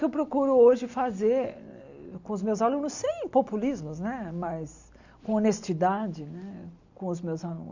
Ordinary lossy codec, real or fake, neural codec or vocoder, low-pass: Opus, 64 kbps; real; none; 7.2 kHz